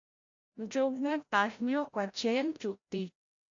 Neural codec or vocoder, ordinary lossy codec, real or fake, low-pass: codec, 16 kHz, 0.5 kbps, FreqCodec, larger model; AAC, 48 kbps; fake; 7.2 kHz